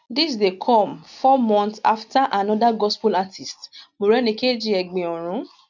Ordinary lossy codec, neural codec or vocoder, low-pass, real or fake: none; none; 7.2 kHz; real